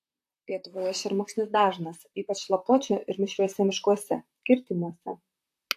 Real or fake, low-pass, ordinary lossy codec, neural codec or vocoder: fake; 14.4 kHz; AAC, 64 kbps; vocoder, 44.1 kHz, 128 mel bands, Pupu-Vocoder